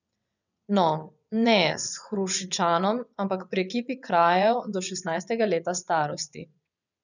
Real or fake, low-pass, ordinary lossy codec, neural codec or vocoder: fake; 7.2 kHz; none; vocoder, 22.05 kHz, 80 mel bands, WaveNeXt